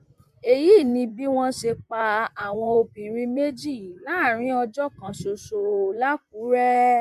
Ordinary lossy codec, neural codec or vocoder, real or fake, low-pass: MP3, 96 kbps; vocoder, 44.1 kHz, 128 mel bands, Pupu-Vocoder; fake; 14.4 kHz